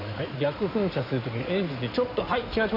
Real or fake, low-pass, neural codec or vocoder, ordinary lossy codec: fake; 5.4 kHz; codec, 16 kHz in and 24 kHz out, 2.2 kbps, FireRedTTS-2 codec; none